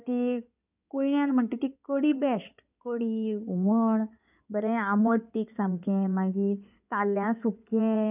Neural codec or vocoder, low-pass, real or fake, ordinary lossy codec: codec, 16 kHz in and 24 kHz out, 2.2 kbps, FireRedTTS-2 codec; 3.6 kHz; fake; none